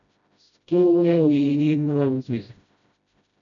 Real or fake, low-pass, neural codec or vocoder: fake; 7.2 kHz; codec, 16 kHz, 0.5 kbps, FreqCodec, smaller model